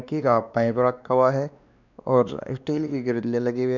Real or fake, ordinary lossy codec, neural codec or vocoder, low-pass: fake; none; codec, 16 kHz, 2 kbps, X-Codec, WavLM features, trained on Multilingual LibriSpeech; 7.2 kHz